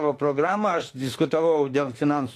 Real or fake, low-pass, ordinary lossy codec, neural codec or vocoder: fake; 14.4 kHz; AAC, 48 kbps; autoencoder, 48 kHz, 32 numbers a frame, DAC-VAE, trained on Japanese speech